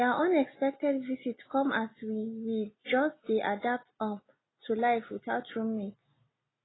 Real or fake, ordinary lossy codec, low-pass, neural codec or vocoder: real; AAC, 16 kbps; 7.2 kHz; none